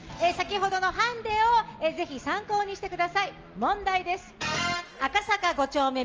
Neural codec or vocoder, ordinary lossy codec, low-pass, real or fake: none; Opus, 24 kbps; 7.2 kHz; real